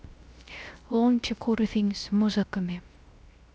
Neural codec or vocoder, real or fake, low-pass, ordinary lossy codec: codec, 16 kHz, 0.3 kbps, FocalCodec; fake; none; none